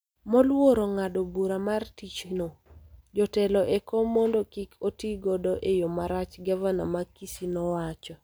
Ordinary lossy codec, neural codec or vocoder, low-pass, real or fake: none; none; none; real